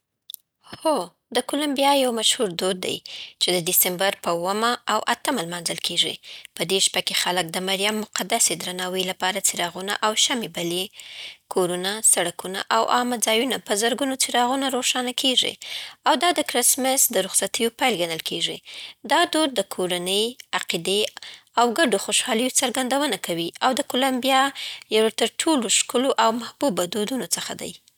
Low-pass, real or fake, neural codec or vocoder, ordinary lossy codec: none; real; none; none